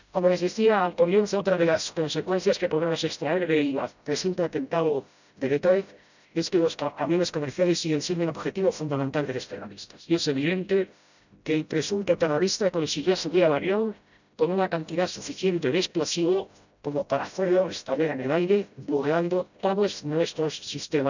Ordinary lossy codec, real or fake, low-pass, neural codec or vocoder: none; fake; 7.2 kHz; codec, 16 kHz, 0.5 kbps, FreqCodec, smaller model